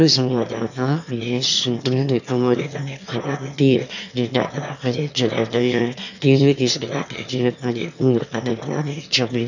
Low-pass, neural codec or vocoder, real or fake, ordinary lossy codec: 7.2 kHz; autoencoder, 22.05 kHz, a latent of 192 numbers a frame, VITS, trained on one speaker; fake; none